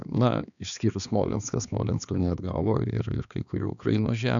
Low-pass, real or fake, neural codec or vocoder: 7.2 kHz; fake; codec, 16 kHz, 2 kbps, X-Codec, HuBERT features, trained on balanced general audio